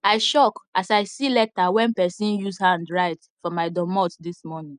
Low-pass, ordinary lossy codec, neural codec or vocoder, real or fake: 9.9 kHz; none; none; real